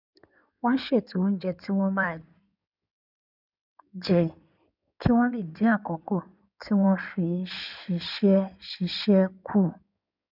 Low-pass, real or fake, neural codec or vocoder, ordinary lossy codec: 5.4 kHz; fake; codec, 16 kHz in and 24 kHz out, 2.2 kbps, FireRedTTS-2 codec; none